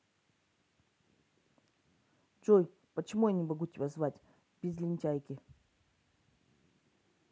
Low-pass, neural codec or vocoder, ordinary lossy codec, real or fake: none; none; none; real